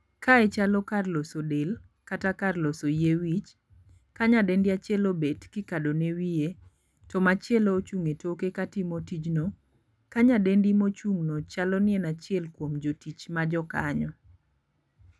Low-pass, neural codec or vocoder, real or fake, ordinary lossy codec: none; none; real; none